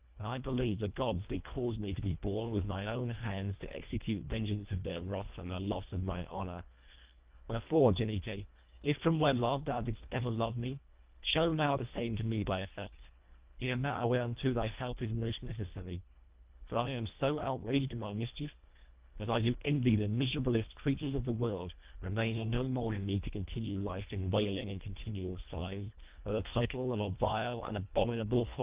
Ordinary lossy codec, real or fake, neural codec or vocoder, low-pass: Opus, 32 kbps; fake; codec, 24 kHz, 1.5 kbps, HILCodec; 3.6 kHz